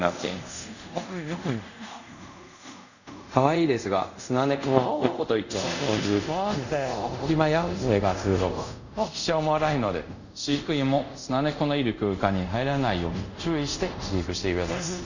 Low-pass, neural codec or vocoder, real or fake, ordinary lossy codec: 7.2 kHz; codec, 24 kHz, 0.5 kbps, DualCodec; fake; none